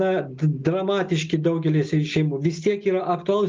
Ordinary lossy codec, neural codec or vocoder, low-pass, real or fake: Opus, 24 kbps; none; 7.2 kHz; real